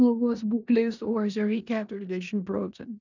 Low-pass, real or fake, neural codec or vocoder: 7.2 kHz; fake; codec, 16 kHz in and 24 kHz out, 0.9 kbps, LongCat-Audio-Codec, four codebook decoder